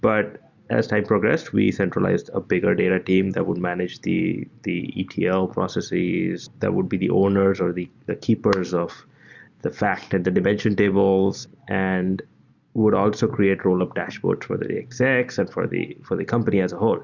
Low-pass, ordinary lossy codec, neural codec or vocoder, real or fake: 7.2 kHz; Opus, 64 kbps; none; real